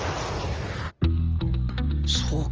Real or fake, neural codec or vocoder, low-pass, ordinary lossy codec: real; none; 7.2 kHz; Opus, 24 kbps